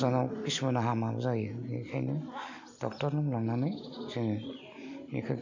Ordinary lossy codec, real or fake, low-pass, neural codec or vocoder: MP3, 48 kbps; real; 7.2 kHz; none